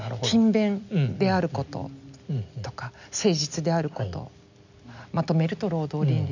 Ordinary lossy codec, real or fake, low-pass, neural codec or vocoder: none; real; 7.2 kHz; none